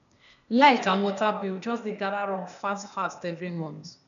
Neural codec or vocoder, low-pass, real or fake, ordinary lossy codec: codec, 16 kHz, 0.8 kbps, ZipCodec; 7.2 kHz; fake; MP3, 96 kbps